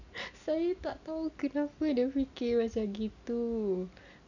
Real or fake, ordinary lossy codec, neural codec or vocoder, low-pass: real; none; none; 7.2 kHz